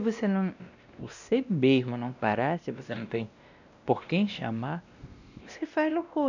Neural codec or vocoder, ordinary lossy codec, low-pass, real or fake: codec, 16 kHz, 1 kbps, X-Codec, WavLM features, trained on Multilingual LibriSpeech; none; 7.2 kHz; fake